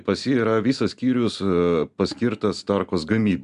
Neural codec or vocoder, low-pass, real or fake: none; 10.8 kHz; real